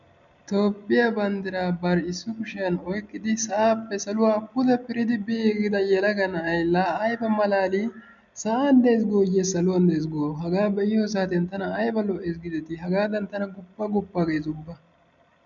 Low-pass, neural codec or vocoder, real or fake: 7.2 kHz; none; real